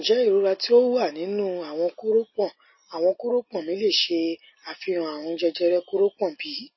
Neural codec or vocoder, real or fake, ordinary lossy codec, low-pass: none; real; MP3, 24 kbps; 7.2 kHz